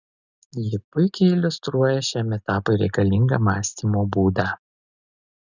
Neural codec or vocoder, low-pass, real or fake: none; 7.2 kHz; real